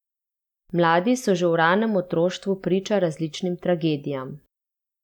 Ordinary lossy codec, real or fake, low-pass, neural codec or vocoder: none; real; 19.8 kHz; none